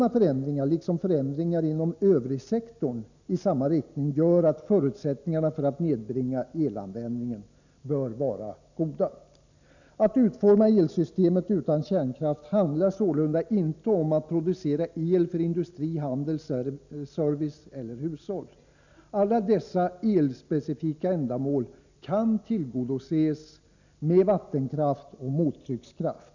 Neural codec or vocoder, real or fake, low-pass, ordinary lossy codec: none; real; 7.2 kHz; none